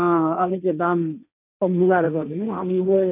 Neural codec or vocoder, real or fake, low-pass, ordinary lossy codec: codec, 16 kHz, 1.1 kbps, Voila-Tokenizer; fake; 3.6 kHz; none